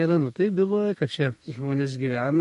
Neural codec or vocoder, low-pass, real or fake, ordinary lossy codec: codec, 32 kHz, 1.9 kbps, SNAC; 14.4 kHz; fake; MP3, 48 kbps